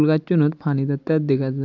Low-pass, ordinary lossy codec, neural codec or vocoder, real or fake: 7.2 kHz; AAC, 48 kbps; none; real